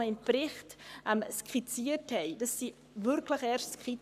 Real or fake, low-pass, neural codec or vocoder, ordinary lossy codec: fake; 14.4 kHz; codec, 44.1 kHz, 7.8 kbps, Pupu-Codec; none